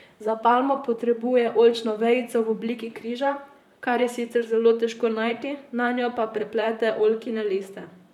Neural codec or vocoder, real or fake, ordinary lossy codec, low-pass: vocoder, 44.1 kHz, 128 mel bands, Pupu-Vocoder; fake; none; 19.8 kHz